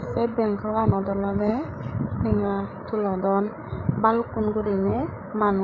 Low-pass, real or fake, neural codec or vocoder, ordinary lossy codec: 7.2 kHz; fake; codec, 16 kHz, 8 kbps, FreqCodec, larger model; none